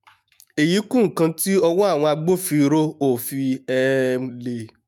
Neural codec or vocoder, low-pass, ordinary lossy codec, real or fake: autoencoder, 48 kHz, 128 numbers a frame, DAC-VAE, trained on Japanese speech; none; none; fake